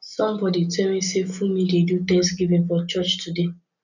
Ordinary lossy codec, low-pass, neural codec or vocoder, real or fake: none; 7.2 kHz; none; real